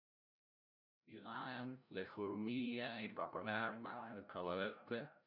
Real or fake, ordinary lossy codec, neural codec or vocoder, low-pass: fake; none; codec, 16 kHz, 0.5 kbps, FreqCodec, larger model; 5.4 kHz